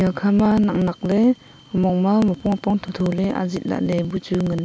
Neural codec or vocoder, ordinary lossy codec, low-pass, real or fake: none; none; none; real